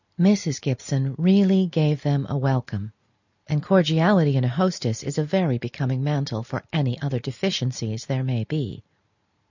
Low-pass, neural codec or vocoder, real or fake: 7.2 kHz; none; real